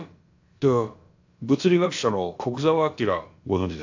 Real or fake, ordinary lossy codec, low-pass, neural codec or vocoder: fake; AAC, 48 kbps; 7.2 kHz; codec, 16 kHz, about 1 kbps, DyCAST, with the encoder's durations